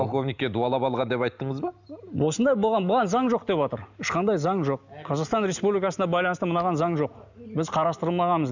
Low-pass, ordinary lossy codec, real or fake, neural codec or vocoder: 7.2 kHz; none; real; none